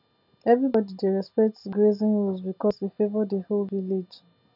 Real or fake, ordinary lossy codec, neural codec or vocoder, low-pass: real; none; none; 5.4 kHz